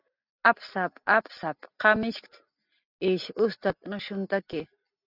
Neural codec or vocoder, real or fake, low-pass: none; real; 5.4 kHz